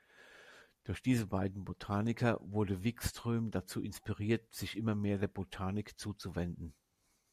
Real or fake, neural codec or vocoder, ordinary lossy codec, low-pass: real; none; MP3, 96 kbps; 14.4 kHz